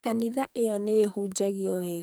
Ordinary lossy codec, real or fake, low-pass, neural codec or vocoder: none; fake; none; codec, 44.1 kHz, 2.6 kbps, SNAC